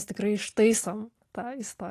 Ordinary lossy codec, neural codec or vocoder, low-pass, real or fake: AAC, 48 kbps; none; 14.4 kHz; real